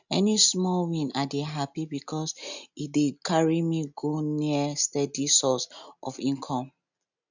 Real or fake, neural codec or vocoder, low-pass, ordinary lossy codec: real; none; 7.2 kHz; none